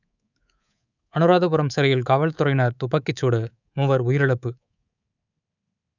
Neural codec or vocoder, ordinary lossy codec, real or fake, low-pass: codec, 24 kHz, 3.1 kbps, DualCodec; none; fake; 7.2 kHz